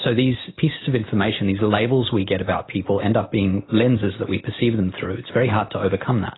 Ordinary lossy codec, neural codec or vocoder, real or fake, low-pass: AAC, 16 kbps; none; real; 7.2 kHz